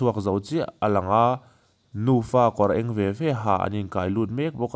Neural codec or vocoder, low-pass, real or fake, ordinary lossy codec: none; none; real; none